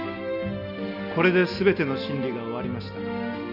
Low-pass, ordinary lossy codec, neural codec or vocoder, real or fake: 5.4 kHz; none; none; real